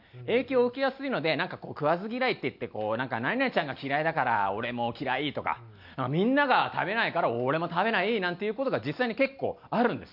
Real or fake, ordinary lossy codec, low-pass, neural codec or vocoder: real; none; 5.4 kHz; none